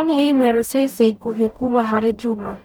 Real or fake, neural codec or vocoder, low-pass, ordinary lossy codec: fake; codec, 44.1 kHz, 0.9 kbps, DAC; 19.8 kHz; none